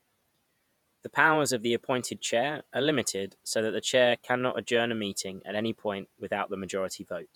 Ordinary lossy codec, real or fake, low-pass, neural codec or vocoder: none; fake; 19.8 kHz; vocoder, 48 kHz, 128 mel bands, Vocos